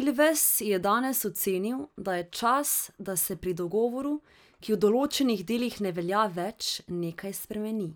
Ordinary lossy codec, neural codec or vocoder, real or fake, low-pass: none; none; real; none